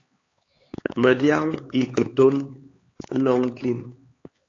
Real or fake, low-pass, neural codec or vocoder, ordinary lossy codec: fake; 7.2 kHz; codec, 16 kHz, 4 kbps, X-Codec, HuBERT features, trained on LibriSpeech; AAC, 32 kbps